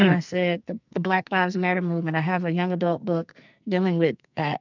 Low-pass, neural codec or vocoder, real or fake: 7.2 kHz; codec, 44.1 kHz, 2.6 kbps, SNAC; fake